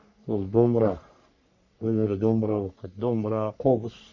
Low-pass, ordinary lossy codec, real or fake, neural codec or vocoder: 7.2 kHz; none; fake; codec, 44.1 kHz, 3.4 kbps, Pupu-Codec